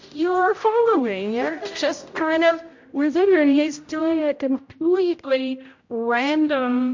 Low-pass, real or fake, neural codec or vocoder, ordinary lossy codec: 7.2 kHz; fake; codec, 16 kHz, 0.5 kbps, X-Codec, HuBERT features, trained on general audio; MP3, 48 kbps